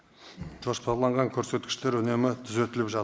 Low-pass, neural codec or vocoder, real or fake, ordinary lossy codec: none; none; real; none